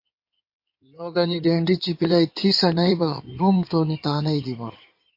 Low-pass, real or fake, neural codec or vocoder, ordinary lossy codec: 5.4 kHz; fake; codec, 16 kHz in and 24 kHz out, 2.2 kbps, FireRedTTS-2 codec; MP3, 32 kbps